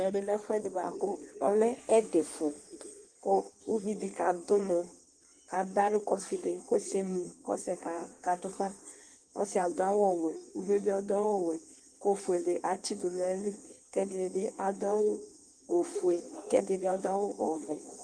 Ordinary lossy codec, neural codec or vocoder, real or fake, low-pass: Opus, 24 kbps; codec, 16 kHz in and 24 kHz out, 1.1 kbps, FireRedTTS-2 codec; fake; 9.9 kHz